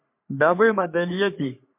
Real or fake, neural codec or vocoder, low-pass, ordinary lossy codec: fake; codec, 44.1 kHz, 3.4 kbps, Pupu-Codec; 3.6 kHz; MP3, 32 kbps